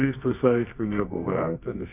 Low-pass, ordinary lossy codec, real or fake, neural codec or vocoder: 3.6 kHz; AAC, 32 kbps; fake; codec, 24 kHz, 0.9 kbps, WavTokenizer, medium music audio release